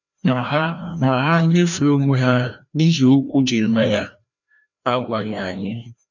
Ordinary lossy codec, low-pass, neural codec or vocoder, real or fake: none; 7.2 kHz; codec, 16 kHz, 1 kbps, FreqCodec, larger model; fake